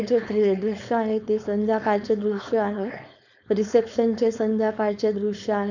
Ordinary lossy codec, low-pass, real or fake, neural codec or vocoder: none; 7.2 kHz; fake; codec, 16 kHz, 4.8 kbps, FACodec